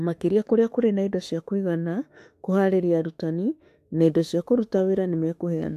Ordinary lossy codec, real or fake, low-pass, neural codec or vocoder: AAC, 64 kbps; fake; 14.4 kHz; autoencoder, 48 kHz, 32 numbers a frame, DAC-VAE, trained on Japanese speech